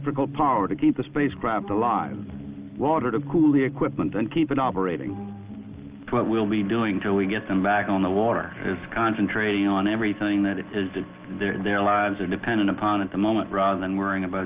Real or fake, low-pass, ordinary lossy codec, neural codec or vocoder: real; 3.6 kHz; Opus, 32 kbps; none